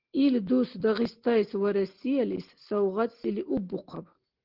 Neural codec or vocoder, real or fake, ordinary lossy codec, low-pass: none; real; Opus, 16 kbps; 5.4 kHz